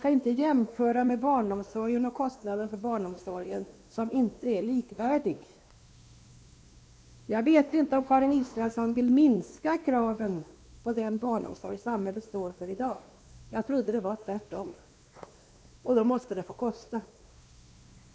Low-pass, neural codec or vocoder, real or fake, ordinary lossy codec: none; codec, 16 kHz, 2 kbps, X-Codec, WavLM features, trained on Multilingual LibriSpeech; fake; none